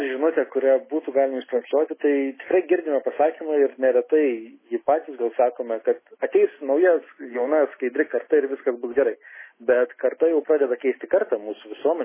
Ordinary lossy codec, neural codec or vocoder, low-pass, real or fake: MP3, 16 kbps; none; 3.6 kHz; real